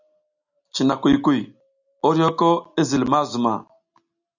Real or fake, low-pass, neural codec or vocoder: real; 7.2 kHz; none